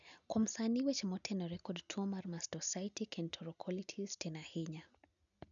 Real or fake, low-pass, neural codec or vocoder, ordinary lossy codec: real; 7.2 kHz; none; none